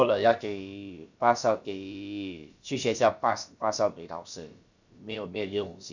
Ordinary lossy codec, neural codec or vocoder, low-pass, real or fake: none; codec, 16 kHz, about 1 kbps, DyCAST, with the encoder's durations; 7.2 kHz; fake